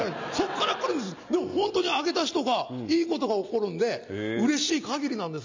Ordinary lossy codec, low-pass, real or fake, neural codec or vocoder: MP3, 64 kbps; 7.2 kHz; real; none